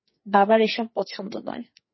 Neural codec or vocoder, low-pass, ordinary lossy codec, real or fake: codec, 44.1 kHz, 2.6 kbps, SNAC; 7.2 kHz; MP3, 24 kbps; fake